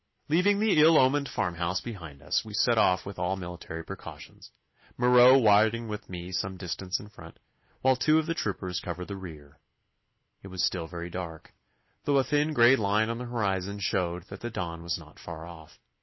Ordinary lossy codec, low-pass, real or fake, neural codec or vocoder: MP3, 24 kbps; 7.2 kHz; real; none